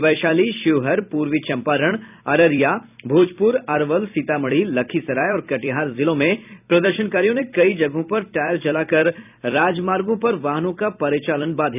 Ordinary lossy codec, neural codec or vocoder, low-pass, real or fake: none; vocoder, 44.1 kHz, 128 mel bands every 256 samples, BigVGAN v2; 3.6 kHz; fake